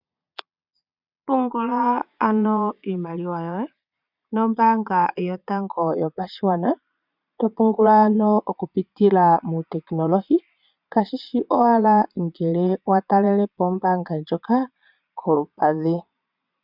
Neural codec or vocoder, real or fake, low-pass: vocoder, 22.05 kHz, 80 mel bands, Vocos; fake; 5.4 kHz